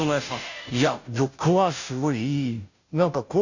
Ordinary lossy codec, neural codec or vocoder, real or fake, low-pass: none; codec, 16 kHz, 0.5 kbps, FunCodec, trained on Chinese and English, 25 frames a second; fake; 7.2 kHz